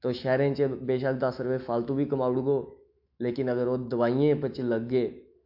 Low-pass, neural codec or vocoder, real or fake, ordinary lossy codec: 5.4 kHz; autoencoder, 48 kHz, 128 numbers a frame, DAC-VAE, trained on Japanese speech; fake; AAC, 48 kbps